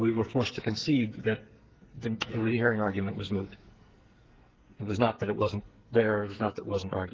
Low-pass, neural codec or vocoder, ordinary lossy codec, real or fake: 7.2 kHz; codec, 44.1 kHz, 2.6 kbps, SNAC; Opus, 16 kbps; fake